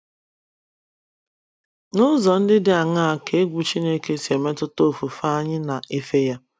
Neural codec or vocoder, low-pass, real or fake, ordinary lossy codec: none; none; real; none